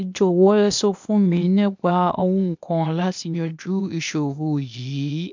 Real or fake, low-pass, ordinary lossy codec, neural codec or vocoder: fake; 7.2 kHz; MP3, 64 kbps; codec, 16 kHz, 0.8 kbps, ZipCodec